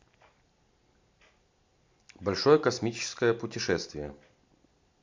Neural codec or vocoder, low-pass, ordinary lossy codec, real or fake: none; 7.2 kHz; MP3, 48 kbps; real